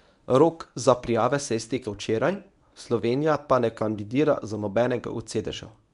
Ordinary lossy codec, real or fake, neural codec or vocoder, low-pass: none; fake; codec, 24 kHz, 0.9 kbps, WavTokenizer, medium speech release version 1; 10.8 kHz